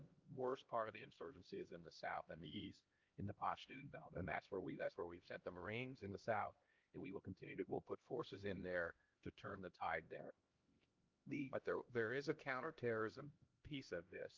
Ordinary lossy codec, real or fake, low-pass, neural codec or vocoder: Opus, 24 kbps; fake; 7.2 kHz; codec, 16 kHz, 1 kbps, X-Codec, HuBERT features, trained on LibriSpeech